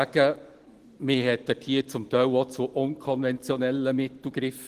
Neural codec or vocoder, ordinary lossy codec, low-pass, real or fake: none; Opus, 16 kbps; 14.4 kHz; real